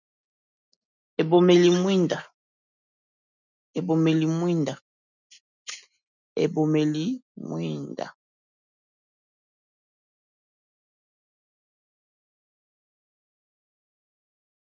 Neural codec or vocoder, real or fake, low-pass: none; real; 7.2 kHz